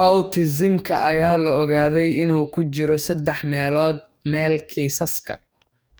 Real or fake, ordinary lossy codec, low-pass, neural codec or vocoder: fake; none; none; codec, 44.1 kHz, 2.6 kbps, DAC